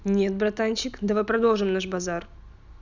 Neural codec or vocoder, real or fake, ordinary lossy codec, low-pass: none; real; none; 7.2 kHz